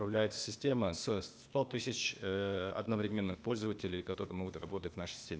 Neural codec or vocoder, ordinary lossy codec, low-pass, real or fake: codec, 16 kHz, 0.8 kbps, ZipCodec; none; none; fake